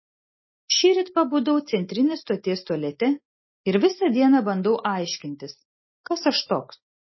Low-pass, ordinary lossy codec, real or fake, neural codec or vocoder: 7.2 kHz; MP3, 24 kbps; real; none